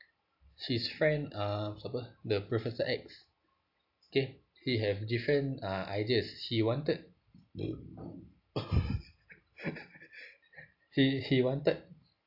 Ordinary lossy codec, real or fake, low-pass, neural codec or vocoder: AAC, 48 kbps; real; 5.4 kHz; none